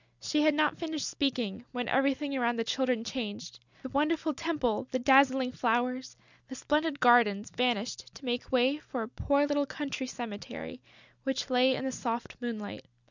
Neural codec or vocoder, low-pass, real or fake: none; 7.2 kHz; real